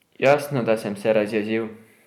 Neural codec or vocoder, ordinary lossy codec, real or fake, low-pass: vocoder, 44.1 kHz, 128 mel bands every 512 samples, BigVGAN v2; none; fake; 19.8 kHz